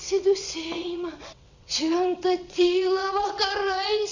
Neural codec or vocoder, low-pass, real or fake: vocoder, 22.05 kHz, 80 mel bands, WaveNeXt; 7.2 kHz; fake